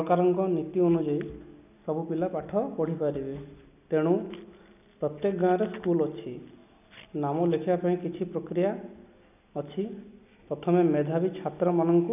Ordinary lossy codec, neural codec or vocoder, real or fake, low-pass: none; none; real; 3.6 kHz